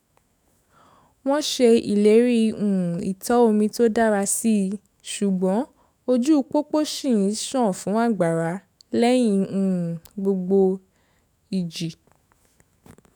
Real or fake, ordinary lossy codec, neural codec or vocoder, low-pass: fake; none; autoencoder, 48 kHz, 128 numbers a frame, DAC-VAE, trained on Japanese speech; none